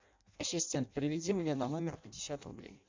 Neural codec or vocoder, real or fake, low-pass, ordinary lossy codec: codec, 16 kHz in and 24 kHz out, 0.6 kbps, FireRedTTS-2 codec; fake; 7.2 kHz; MP3, 64 kbps